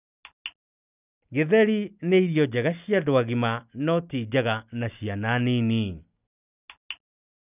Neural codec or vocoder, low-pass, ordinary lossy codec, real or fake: none; 3.6 kHz; none; real